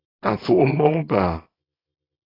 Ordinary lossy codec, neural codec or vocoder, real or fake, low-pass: AAC, 24 kbps; codec, 24 kHz, 0.9 kbps, WavTokenizer, small release; fake; 5.4 kHz